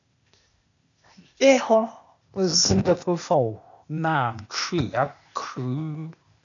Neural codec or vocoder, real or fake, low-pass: codec, 16 kHz, 0.8 kbps, ZipCodec; fake; 7.2 kHz